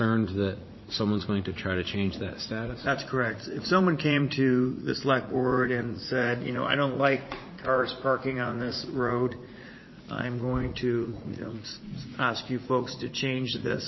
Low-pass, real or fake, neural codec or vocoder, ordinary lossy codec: 7.2 kHz; fake; vocoder, 44.1 kHz, 80 mel bands, Vocos; MP3, 24 kbps